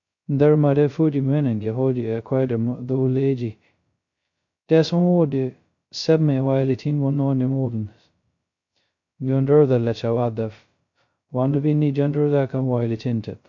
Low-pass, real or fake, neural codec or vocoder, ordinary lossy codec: 7.2 kHz; fake; codec, 16 kHz, 0.2 kbps, FocalCodec; MP3, 48 kbps